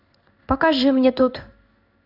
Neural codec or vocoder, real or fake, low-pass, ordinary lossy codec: codec, 16 kHz in and 24 kHz out, 1 kbps, XY-Tokenizer; fake; 5.4 kHz; AAC, 48 kbps